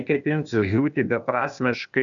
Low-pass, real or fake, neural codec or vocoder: 7.2 kHz; fake; codec, 16 kHz, 0.8 kbps, ZipCodec